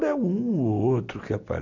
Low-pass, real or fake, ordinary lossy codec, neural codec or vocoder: 7.2 kHz; real; none; none